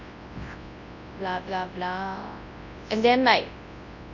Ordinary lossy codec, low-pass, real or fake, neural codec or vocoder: none; 7.2 kHz; fake; codec, 24 kHz, 0.9 kbps, WavTokenizer, large speech release